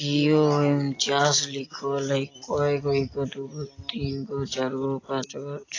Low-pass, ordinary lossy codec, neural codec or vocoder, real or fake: 7.2 kHz; AAC, 32 kbps; none; real